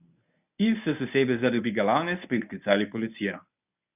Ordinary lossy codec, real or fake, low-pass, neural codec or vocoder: none; fake; 3.6 kHz; codec, 24 kHz, 0.9 kbps, WavTokenizer, medium speech release version 1